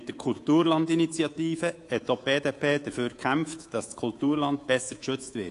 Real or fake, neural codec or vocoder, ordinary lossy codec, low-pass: fake; codec, 24 kHz, 3.1 kbps, DualCodec; AAC, 48 kbps; 10.8 kHz